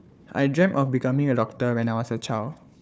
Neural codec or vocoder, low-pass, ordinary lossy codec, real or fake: codec, 16 kHz, 4 kbps, FunCodec, trained on Chinese and English, 50 frames a second; none; none; fake